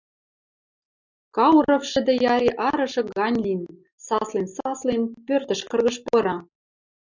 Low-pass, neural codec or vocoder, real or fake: 7.2 kHz; none; real